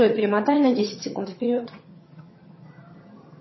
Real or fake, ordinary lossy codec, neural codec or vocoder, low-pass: fake; MP3, 24 kbps; vocoder, 22.05 kHz, 80 mel bands, HiFi-GAN; 7.2 kHz